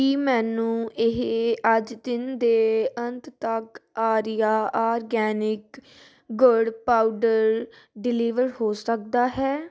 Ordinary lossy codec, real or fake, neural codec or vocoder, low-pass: none; real; none; none